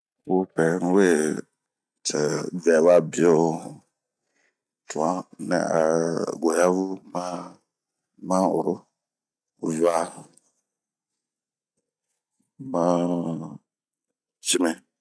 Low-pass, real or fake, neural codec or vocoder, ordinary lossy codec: none; real; none; none